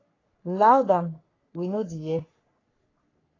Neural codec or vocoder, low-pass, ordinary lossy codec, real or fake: codec, 44.1 kHz, 3.4 kbps, Pupu-Codec; 7.2 kHz; AAC, 32 kbps; fake